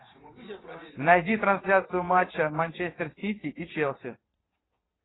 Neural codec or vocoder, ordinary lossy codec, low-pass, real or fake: vocoder, 22.05 kHz, 80 mel bands, WaveNeXt; AAC, 16 kbps; 7.2 kHz; fake